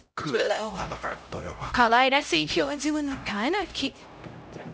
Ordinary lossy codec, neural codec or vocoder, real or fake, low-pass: none; codec, 16 kHz, 0.5 kbps, X-Codec, HuBERT features, trained on LibriSpeech; fake; none